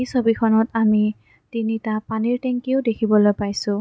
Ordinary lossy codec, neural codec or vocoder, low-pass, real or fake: none; none; none; real